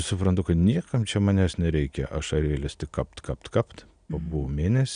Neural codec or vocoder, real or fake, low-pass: none; real; 9.9 kHz